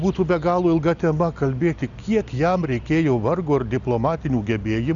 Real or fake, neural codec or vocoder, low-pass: real; none; 7.2 kHz